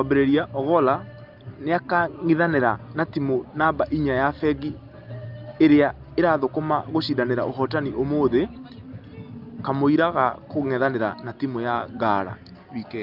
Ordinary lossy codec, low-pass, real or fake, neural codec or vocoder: Opus, 24 kbps; 5.4 kHz; real; none